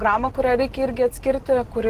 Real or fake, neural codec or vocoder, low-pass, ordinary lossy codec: real; none; 14.4 kHz; Opus, 16 kbps